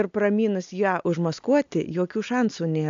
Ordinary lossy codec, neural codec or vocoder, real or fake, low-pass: AAC, 64 kbps; none; real; 7.2 kHz